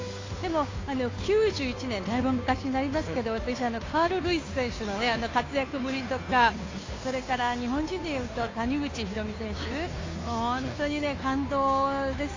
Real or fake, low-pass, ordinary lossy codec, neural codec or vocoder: fake; 7.2 kHz; AAC, 32 kbps; codec, 16 kHz, 2 kbps, FunCodec, trained on Chinese and English, 25 frames a second